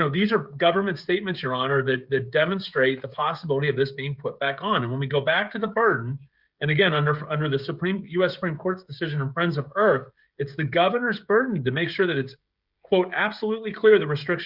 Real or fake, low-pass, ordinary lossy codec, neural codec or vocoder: fake; 5.4 kHz; Opus, 64 kbps; codec, 16 kHz, 16 kbps, FreqCodec, smaller model